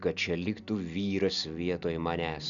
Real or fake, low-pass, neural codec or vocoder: real; 7.2 kHz; none